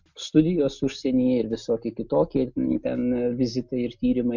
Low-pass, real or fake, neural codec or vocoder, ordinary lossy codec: 7.2 kHz; real; none; MP3, 64 kbps